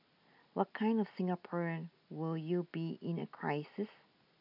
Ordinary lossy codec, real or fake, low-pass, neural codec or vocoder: none; real; 5.4 kHz; none